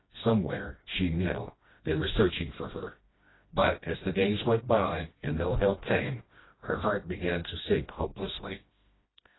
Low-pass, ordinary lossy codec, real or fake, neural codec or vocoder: 7.2 kHz; AAC, 16 kbps; fake; codec, 16 kHz, 1 kbps, FreqCodec, smaller model